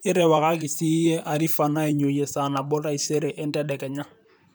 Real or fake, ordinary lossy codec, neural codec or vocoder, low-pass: fake; none; vocoder, 44.1 kHz, 128 mel bands, Pupu-Vocoder; none